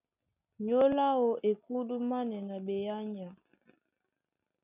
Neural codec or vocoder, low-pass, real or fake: none; 3.6 kHz; real